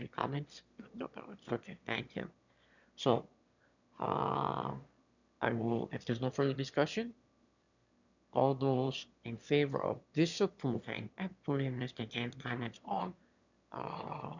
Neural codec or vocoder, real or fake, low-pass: autoencoder, 22.05 kHz, a latent of 192 numbers a frame, VITS, trained on one speaker; fake; 7.2 kHz